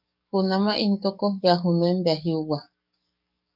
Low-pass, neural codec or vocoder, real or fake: 5.4 kHz; codec, 44.1 kHz, 7.8 kbps, DAC; fake